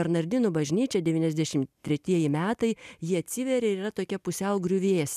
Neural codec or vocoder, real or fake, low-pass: none; real; 14.4 kHz